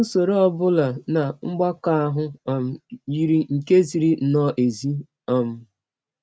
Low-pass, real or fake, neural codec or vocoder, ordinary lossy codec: none; real; none; none